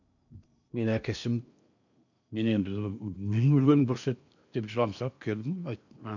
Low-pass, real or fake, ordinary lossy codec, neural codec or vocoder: 7.2 kHz; fake; none; codec, 16 kHz in and 24 kHz out, 0.8 kbps, FocalCodec, streaming, 65536 codes